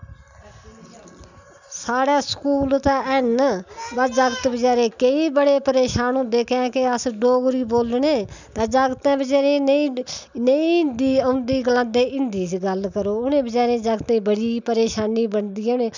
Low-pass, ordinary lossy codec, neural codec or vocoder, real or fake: 7.2 kHz; none; none; real